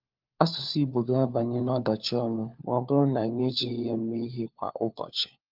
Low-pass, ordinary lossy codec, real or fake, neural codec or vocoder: 5.4 kHz; Opus, 24 kbps; fake; codec, 16 kHz, 4 kbps, FunCodec, trained on LibriTTS, 50 frames a second